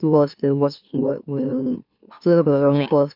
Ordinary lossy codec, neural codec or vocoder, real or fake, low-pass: none; autoencoder, 44.1 kHz, a latent of 192 numbers a frame, MeloTTS; fake; 5.4 kHz